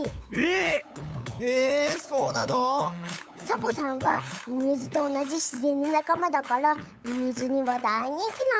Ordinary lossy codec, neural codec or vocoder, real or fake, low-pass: none; codec, 16 kHz, 8 kbps, FunCodec, trained on LibriTTS, 25 frames a second; fake; none